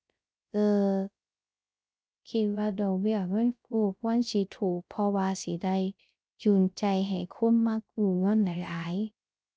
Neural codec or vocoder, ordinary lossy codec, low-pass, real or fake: codec, 16 kHz, 0.3 kbps, FocalCodec; none; none; fake